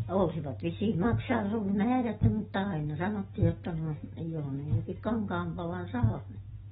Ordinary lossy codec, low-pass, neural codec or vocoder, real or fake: AAC, 16 kbps; 14.4 kHz; none; real